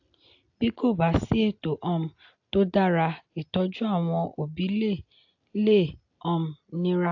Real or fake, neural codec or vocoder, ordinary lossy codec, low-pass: real; none; AAC, 48 kbps; 7.2 kHz